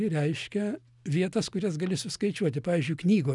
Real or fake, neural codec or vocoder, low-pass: real; none; 10.8 kHz